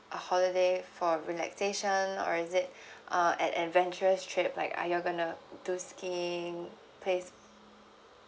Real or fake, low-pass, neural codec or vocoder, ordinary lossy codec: real; none; none; none